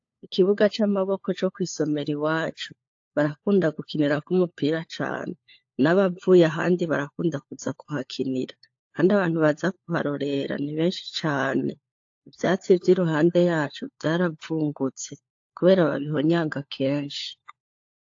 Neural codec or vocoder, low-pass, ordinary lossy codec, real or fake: codec, 16 kHz, 4 kbps, FunCodec, trained on LibriTTS, 50 frames a second; 7.2 kHz; AAC, 48 kbps; fake